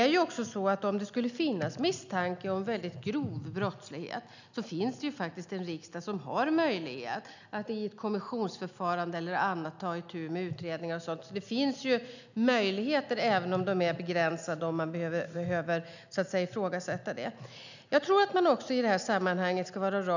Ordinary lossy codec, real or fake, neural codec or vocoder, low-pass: none; real; none; 7.2 kHz